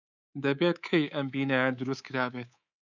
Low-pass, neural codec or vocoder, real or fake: 7.2 kHz; codec, 24 kHz, 3.1 kbps, DualCodec; fake